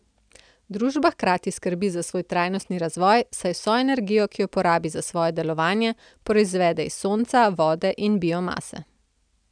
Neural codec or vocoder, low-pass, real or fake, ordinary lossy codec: none; 9.9 kHz; real; none